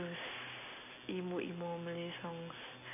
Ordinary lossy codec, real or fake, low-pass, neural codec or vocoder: none; real; 3.6 kHz; none